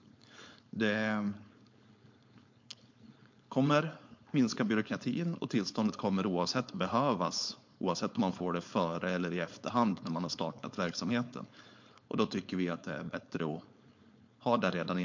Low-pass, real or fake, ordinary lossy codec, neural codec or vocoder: 7.2 kHz; fake; MP3, 48 kbps; codec, 16 kHz, 4.8 kbps, FACodec